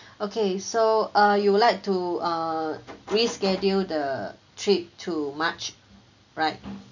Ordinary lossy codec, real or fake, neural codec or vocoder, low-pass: none; real; none; 7.2 kHz